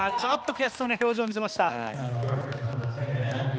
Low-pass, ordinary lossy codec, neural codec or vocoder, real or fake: none; none; codec, 16 kHz, 2 kbps, X-Codec, HuBERT features, trained on balanced general audio; fake